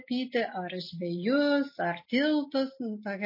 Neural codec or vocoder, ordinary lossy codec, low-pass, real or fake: none; MP3, 24 kbps; 5.4 kHz; real